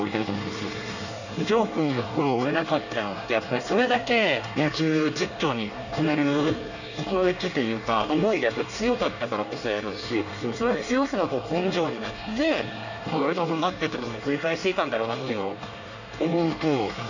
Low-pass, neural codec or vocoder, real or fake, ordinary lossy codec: 7.2 kHz; codec, 24 kHz, 1 kbps, SNAC; fake; none